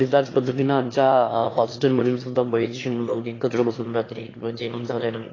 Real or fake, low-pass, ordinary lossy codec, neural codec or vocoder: fake; 7.2 kHz; AAC, 32 kbps; autoencoder, 22.05 kHz, a latent of 192 numbers a frame, VITS, trained on one speaker